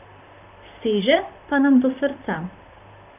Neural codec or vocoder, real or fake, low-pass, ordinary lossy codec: vocoder, 44.1 kHz, 128 mel bands, Pupu-Vocoder; fake; 3.6 kHz; none